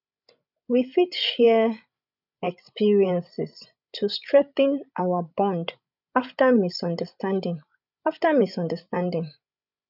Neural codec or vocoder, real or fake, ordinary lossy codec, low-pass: codec, 16 kHz, 16 kbps, FreqCodec, larger model; fake; none; 5.4 kHz